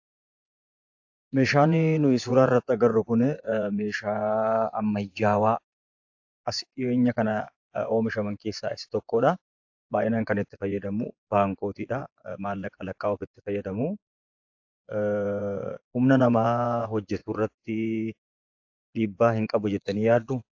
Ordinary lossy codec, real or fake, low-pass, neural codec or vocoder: AAC, 48 kbps; fake; 7.2 kHz; vocoder, 24 kHz, 100 mel bands, Vocos